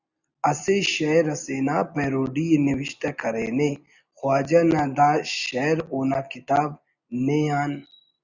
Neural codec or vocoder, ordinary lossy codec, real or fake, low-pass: none; Opus, 64 kbps; real; 7.2 kHz